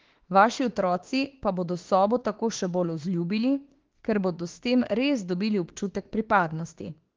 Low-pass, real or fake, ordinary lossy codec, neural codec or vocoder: 7.2 kHz; fake; Opus, 16 kbps; autoencoder, 48 kHz, 32 numbers a frame, DAC-VAE, trained on Japanese speech